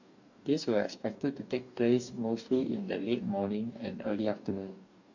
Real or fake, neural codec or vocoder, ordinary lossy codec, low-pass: fake; codec, 44.1 kHz, 2.6 kbps, DAC; none; 7.2 kHz